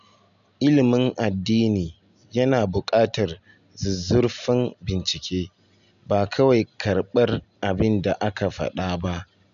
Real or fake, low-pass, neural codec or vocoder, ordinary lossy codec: real; 7.2 kHz; none; none